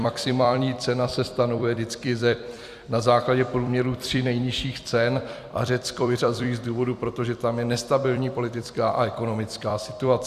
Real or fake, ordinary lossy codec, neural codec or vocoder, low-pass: fake; Opus, 64 kbps; vocoder, 44.1 kHz, 128 mel bands every 256 samples, BigVGAN v2; 14.4 kHz